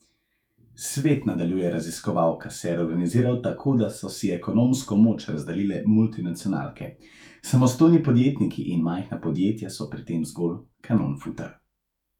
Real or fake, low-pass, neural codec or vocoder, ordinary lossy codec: fake; 19.8 kHz; autoencoder, 48 kHz, 128 numbers a frame, DAC-VAE, trained on Japanese speech; none